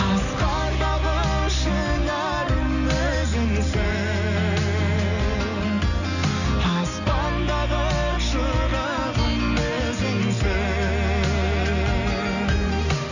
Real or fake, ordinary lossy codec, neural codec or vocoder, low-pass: real; none; none; 7.2 kHz